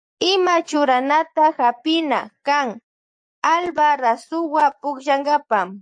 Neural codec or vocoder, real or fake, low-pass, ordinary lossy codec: vocoder, 24 kHz, 100 mel bands, Vocos; fake; 9.9 kHz; AAC, 64 kbps